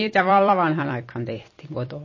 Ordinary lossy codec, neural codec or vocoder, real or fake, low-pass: MP3, 32 kbps; vocoder, 44.1 kHz, 128 mel bands every 256 samples, BigVGAN v2; fake; 7.2 kHz